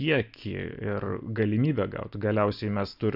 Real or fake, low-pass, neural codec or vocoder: real; 5.4 kHz; none